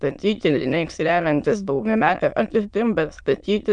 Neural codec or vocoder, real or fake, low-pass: autoencoder, 22.05 kHz, a latent of 192 numbers a frame, VITS, trained on many speakers; fake; 9.9 kHz